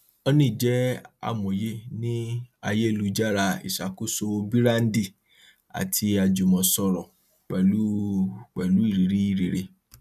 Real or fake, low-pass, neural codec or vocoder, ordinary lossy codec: real; 14.4 kHz; none; none